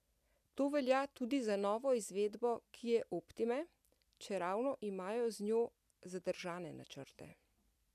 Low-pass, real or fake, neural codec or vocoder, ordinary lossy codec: 14.4 kHz; real; none; none